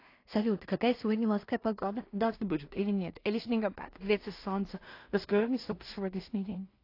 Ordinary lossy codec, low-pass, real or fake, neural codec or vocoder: AAC, 32 kbps; 5.4 kHz; fake; codec, 16 kHz in and 24 kHz out, 0.4 kbps, LongCat-Audio-Codec, two codebook decoder